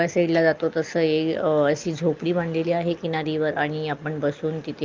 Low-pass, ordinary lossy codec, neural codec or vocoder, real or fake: 7.2 kHz; Opus, 24 kbps; none; real